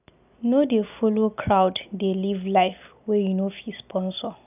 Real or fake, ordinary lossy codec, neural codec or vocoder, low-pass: real; none; none; 3.6 kHz